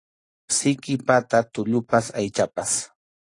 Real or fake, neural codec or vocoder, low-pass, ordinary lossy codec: real; none; 10.8 kHz; AAC, 48 kbps